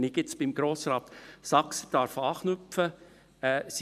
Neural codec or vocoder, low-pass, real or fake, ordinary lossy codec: vocoder, 44.1 kHz, 128 mel bands every 512 samples, BigVGAN v2; 14.4 kHz; fake; none